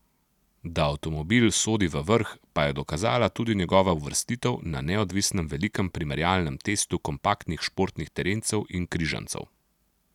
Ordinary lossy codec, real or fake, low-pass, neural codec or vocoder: none; real; 19.8 kHz; none